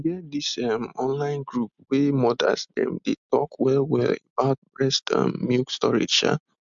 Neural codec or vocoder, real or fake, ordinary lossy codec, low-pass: none; real; MP3, 64 kbps; 7.2 kHz